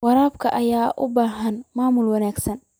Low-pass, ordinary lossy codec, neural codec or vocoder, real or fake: none; none; none; real